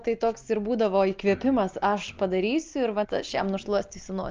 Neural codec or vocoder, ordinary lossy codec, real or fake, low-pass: none; Opus, 24 kbps; real; 7.2 kHz